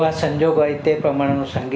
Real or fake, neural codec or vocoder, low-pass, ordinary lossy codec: real; none; none; none